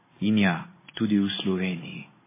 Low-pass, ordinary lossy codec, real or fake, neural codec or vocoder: 3.6 kHz; MP3, 16 kbps; fake; codec, 16 kHz in and 24 kHz out, 1 kbps, XY-Tokenizer